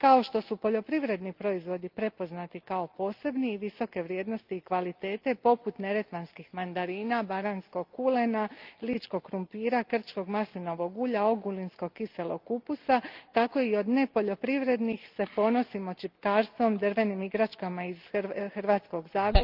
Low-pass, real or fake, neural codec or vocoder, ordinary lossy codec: 5.4 kHz; real; none; Opus, 32 kbps